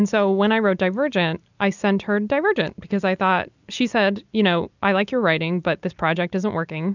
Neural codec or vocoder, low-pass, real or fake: none; 7.2 kHz; real